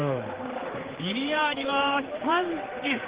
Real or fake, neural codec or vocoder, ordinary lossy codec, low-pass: fake; codec, 16 kHz, 4 kbps, X-Codec, HuBERT features, trained on general audio; Opus, 16 kbps; 3.6 kHz